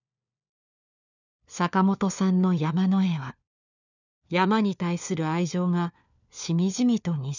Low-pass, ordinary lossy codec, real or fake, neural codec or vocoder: 7.2 kHz; none; fake; codec, 16 kHz, 4 kbps, FunCodec, trained on LibriTTS, 50 frames a second